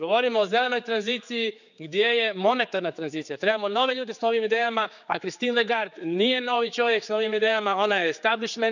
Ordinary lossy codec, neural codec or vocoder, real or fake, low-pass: none; codec, 16 kHz, 4 kbps, X-Codec, HuBERT features, trained on general audio; fake; 7.2 kHz